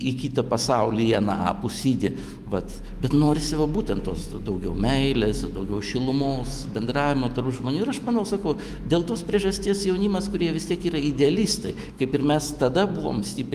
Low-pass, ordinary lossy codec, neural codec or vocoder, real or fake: 14.4 kHz; Opus, 32 kbps; autoencoder, 48 kHz, 128 numbers a frame, DAC-VAE, trained on Japanese speech; fake